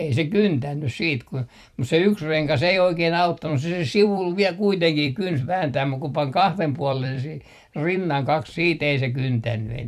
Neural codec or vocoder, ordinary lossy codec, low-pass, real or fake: vocoder, 44.1 kHz, 128 mel bands every 256 samples, BigVGAN v2; none; 14.4 kHz; fake